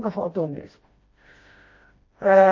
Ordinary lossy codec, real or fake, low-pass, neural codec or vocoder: MP3, 32 kbps; fake; 7.2 kHz; codec, 16 kHz, 1 kbps, FreqCodec, smaller model